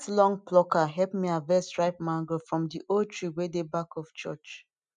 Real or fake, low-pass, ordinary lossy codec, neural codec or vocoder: real; 9.9 kHz; none; none